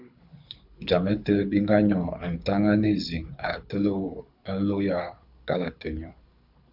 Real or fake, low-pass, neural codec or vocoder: fake; 5.4 kHz; codec, 24 kHz, 6 kbps, HILCodec